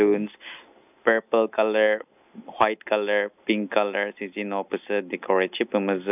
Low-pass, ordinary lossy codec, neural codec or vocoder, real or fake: 3.6 kHz; none; none; real